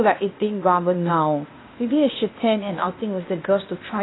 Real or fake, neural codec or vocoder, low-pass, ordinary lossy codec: fake; codec, 16 kHz, 0.8 kbps, ZipCodec; 7.2 kHz; AAC, 16 kbps